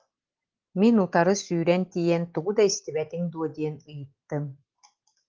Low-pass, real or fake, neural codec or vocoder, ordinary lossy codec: 7.2 kHz; real; none; Opus, 16 kbps